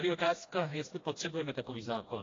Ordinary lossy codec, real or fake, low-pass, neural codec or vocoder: AAC, 32 kbps; fake; 7.2 kHz; codec, 16 kHz, 1 kbps, FreqCodec, smaller model